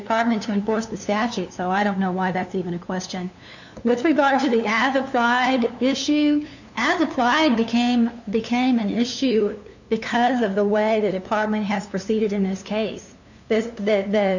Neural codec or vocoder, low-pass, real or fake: codec, 16 kHz, 2 kbps, FunCodec, trained on LibriTTS, 25 frames a second; 7.2 kHz; fake